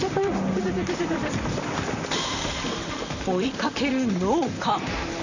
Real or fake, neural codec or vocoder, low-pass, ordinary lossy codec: fake; vocoder, 44.1 kHz, 128 mel bands, Pupu-Vocoder; 7.2 kHz; none